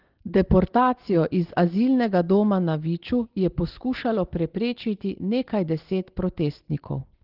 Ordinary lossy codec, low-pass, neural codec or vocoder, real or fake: Opus, 16 kbps; 5.4 kHz; none; real